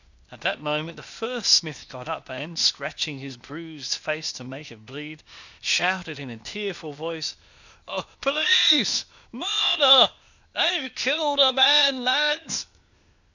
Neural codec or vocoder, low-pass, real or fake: codec, 16 kHz, 0.8 kbps, ZipCodec; 7.2 kHz; fake